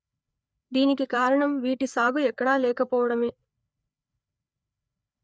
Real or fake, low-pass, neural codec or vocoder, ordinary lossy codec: fake; none; codec, 16 kHz, 4 kbps, FreqCodec, larger model; none